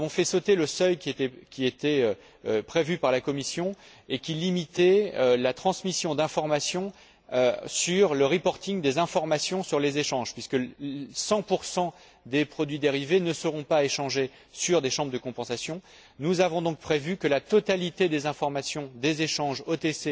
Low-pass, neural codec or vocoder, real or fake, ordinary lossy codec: none; none; real; none